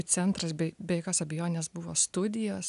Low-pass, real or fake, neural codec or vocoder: 10.8 kHz; real; none